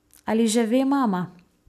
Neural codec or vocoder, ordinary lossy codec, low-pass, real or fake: none; none; 14.4 kHz; real